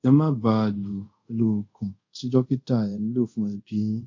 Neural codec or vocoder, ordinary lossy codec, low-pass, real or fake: codec, 24 kHz, 0.5 kbps, DualCodec; MP3, 48 kbps; 7.2 kHz; fake